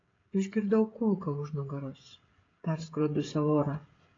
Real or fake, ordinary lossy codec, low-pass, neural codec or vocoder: fake; AAC, 32 kbps; 7.2 kHz; codec, 16 kHz, 16 kbps, FreqCodec, smaller model